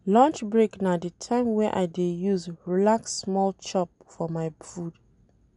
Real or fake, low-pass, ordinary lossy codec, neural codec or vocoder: real; 10.8 kHz; none; none